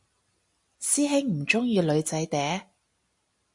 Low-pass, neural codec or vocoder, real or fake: 10.8 kHz; none; real